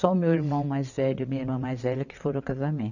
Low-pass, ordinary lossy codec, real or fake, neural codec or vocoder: 7.2 kHz; none; fake; vocoder, 22.05 kHz, 80 mel bands, WaveNeXt